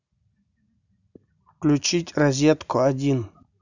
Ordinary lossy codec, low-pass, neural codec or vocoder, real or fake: none; 7.2 kHz; none; real